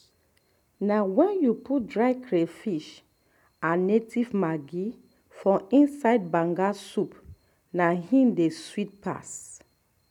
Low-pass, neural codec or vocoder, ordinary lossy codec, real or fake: 19.8 kHz; none; none; real